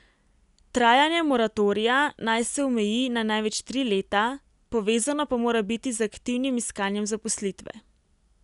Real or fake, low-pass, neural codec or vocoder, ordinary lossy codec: real; 10.8 kHz; none; none